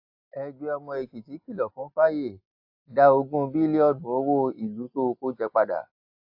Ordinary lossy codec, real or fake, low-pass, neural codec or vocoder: AAC, 48 kbps; real; 5.4 kHz; none